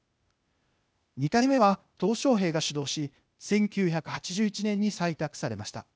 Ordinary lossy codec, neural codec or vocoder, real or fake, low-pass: none; codec, 16 kHz, 0.8 kbps, ZipCodec; fake; none